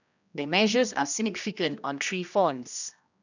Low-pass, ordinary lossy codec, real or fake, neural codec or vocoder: 7.2 kHz; none; fake; codec, 16 kHz, 1 kbps, X-Codec, HuBERT features, trained on general audio